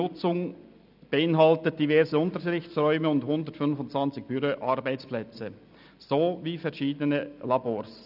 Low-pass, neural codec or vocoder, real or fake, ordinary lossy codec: 5.4 kHz; none; real; none